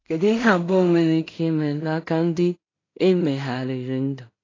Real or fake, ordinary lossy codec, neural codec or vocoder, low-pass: fake; AAC, 32 kbps; codec, 16 kHz in and 24 kHz out, 0.4 kbps, LongCat-Audio-Codec, two codebook decoder; 7.2 kHz